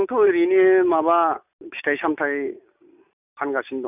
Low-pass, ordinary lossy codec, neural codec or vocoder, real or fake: 3.6 kHz; none; none; real